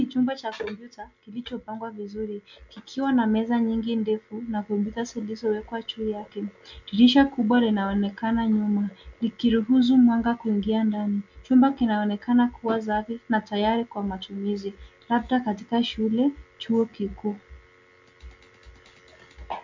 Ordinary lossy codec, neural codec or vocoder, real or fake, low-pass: MP3, 64 kbps; none; real; 7.2 kHz